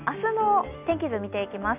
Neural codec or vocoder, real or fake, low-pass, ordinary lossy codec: none; real; 3.6 kHz; none